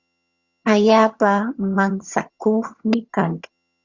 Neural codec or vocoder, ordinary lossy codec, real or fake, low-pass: vocoder, 22.05 kHz, 80 mel bands, HiFi-GAN; Opus, 64 kbps; fake; 7.2 kHz